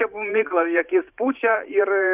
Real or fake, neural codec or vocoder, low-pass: fake; vocoder, 44.1 kHz, 128 mel bands every 512 samples, BigVGAN v2; 3.6 kHz